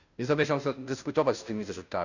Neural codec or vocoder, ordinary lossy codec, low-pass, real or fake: codec, 16 kHz, 0.5 kbps, FunCodec, trained on Chinese and English, 25 frames a second; none; 7.2 kHz; fake